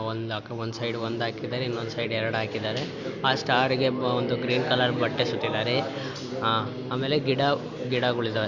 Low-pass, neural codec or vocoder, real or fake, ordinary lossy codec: 7.2 kHz; none; real; Opus, 64 kbps